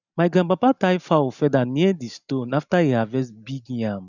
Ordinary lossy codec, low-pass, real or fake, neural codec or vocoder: none; 7.2 kHz; real; none